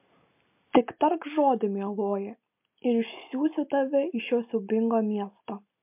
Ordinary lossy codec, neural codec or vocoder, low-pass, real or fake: MP3, 32 kbps; none; 3.6 kHz; real